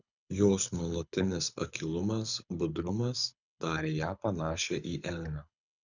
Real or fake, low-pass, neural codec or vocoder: fake; 7.2 kHz; codec, 24 kHz, 6 kbps, HILCodec